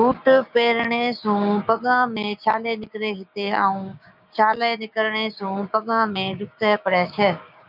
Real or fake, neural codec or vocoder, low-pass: fake; codec, 16 kHz, 6 kbps, DAC; 5.4 kHz